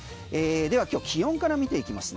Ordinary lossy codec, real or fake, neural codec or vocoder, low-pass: none; real; none; none